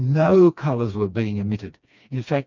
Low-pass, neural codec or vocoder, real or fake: 7.2 kHz; codec, 16 kHz, 2 kbps, FreqCodec, smaller model; fake